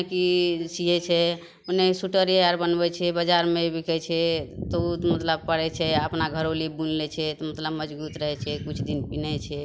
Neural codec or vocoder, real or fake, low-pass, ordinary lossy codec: none; real; none; none